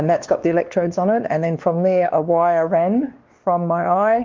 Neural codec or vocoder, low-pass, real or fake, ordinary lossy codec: codec, 16 kHz, 2 kbps, X-Codec, WavLM features, trained on Multilingual LibriSpeech; 7.2 kHz; fake; Opus, 32 kbps